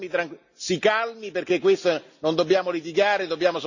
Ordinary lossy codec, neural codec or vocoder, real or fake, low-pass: none; none; real; 7.2 kHz